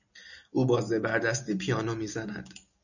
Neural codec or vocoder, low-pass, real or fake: none; 7.2 kHz; real